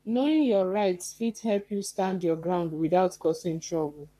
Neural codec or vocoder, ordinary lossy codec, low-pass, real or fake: codec, 44.1 kHz, 3.4 kbps, Pupu-Codec; none; 14.4 kHz; fake